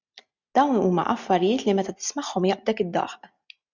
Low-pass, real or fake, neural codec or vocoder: 7.2 kHz; real; none